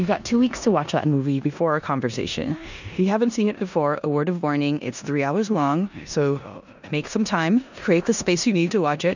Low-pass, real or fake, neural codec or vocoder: 7.2 kHz; fake; codec, 16 kHz in and 24 kHz out, 0.9 kbps, LongCat-Audio-Codec, four codebook decoder